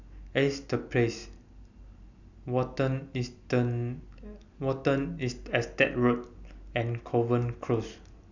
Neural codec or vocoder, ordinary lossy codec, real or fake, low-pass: none; none; real; 7.2 kHz